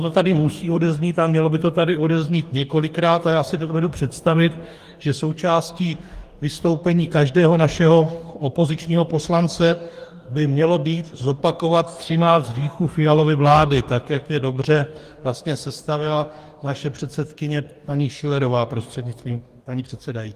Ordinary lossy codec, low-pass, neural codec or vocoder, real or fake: Opus, 24 kbps; 14.4 kHz; codec, 44.1 kHz, 2.6 kbps, DAC; fake